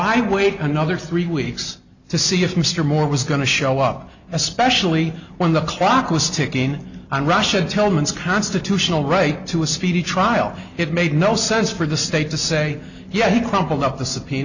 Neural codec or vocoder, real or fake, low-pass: none; real; 7.2 kHz